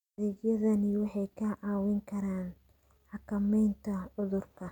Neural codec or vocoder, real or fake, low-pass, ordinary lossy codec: none; real; 19.8 kHz; none